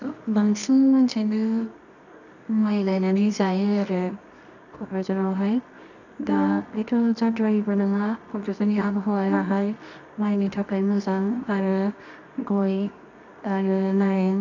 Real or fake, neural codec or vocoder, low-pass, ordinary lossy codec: fake; codec, 24 kHz, 0.9 kbps, WavTokenizer, medium music audio release; 7.2 kHz; none